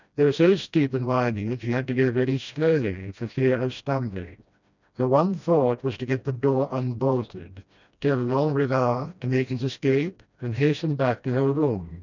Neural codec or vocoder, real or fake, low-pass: codec, 16 kHz, 1 kbps, FreqCodec, smaller model; fake; 7.2 kHz